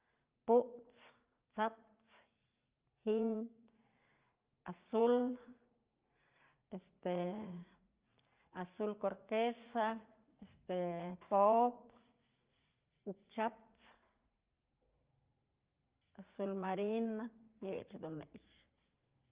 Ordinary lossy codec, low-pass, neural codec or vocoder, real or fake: Opus, 32 kbps; 3.6 kHz; vocoder, 44.1 kHz, 80 mel bands, Vocos; fake